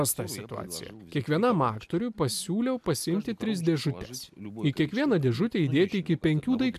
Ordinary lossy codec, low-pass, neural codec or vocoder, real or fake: AAC, 96 kbps; 14.4 kHz; none; real